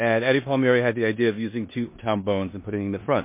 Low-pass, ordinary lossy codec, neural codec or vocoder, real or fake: 3.6 kHz; MP3, 24 kbps; codec, 16 kHz in and 24 kHz out, 0.9 kbps, LongCat-Audio-Codec, four codebook decoder; fake